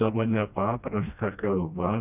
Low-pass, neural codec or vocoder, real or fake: 3.6 kHz; codec, 16 kHz, 1 kbps, FreqCodec, smaller model; fake